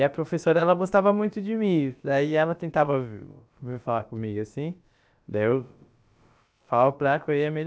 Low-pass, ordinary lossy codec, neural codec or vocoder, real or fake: none; none; codec, 16 kHz, about 1 kbps, DyCAST, with the encoder's durations; fake